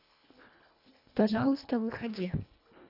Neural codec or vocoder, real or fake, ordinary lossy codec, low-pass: codec, 24 kHz, 1.5 kbps, HILCodec; fake; none; 5.4 kHz